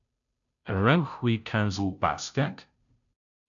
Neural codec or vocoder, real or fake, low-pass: codec, 16 kHz, 0.5 kbps, FunCodec, trained on Chinese and English, 25 frames a second; fake; 7.2 kHz